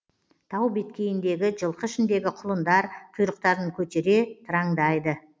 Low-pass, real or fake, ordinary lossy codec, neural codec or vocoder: none; real; none; none